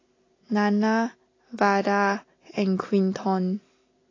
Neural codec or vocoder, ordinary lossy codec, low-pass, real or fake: none; AAC, 32 kbps; 7.2 kHz; real